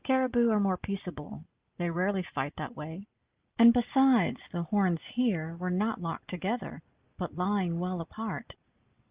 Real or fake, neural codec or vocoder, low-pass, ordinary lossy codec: real; none; 3.6 kHz; Opus, 32 kbps